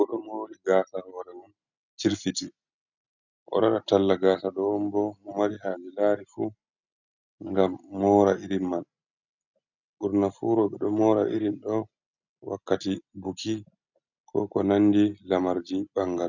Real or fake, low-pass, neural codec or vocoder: real; 7.2 kHz; none